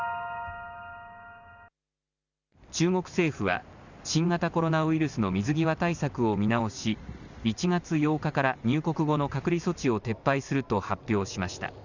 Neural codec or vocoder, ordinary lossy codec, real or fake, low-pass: none; none; real; 7.2 kHz